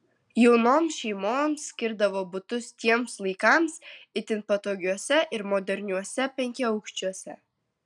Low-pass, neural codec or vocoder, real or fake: 10.8 kHz; none; real